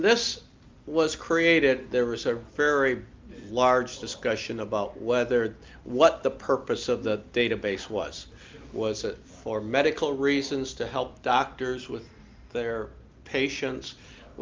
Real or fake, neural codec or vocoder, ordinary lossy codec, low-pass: real; none; Opus, 24 kbps; 7.2 kHz